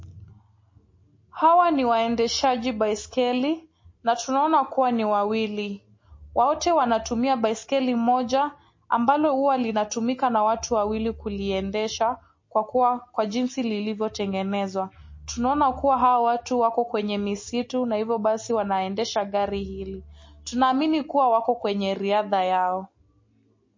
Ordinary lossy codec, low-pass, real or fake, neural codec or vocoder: MP3, 32 kbps; 7.2 kHz; real; none